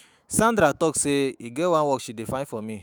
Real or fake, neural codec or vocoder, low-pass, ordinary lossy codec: fake; autoencoder, 48 kHz, 128 numbers a frame, DAC-VAE, trained on Japanese speech; none; none